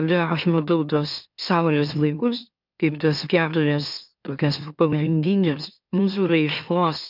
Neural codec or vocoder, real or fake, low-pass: autoencoder, 44.1 kHz, a latent of 192 numbers a frame, MeloTTS; fake; 5.4 kHz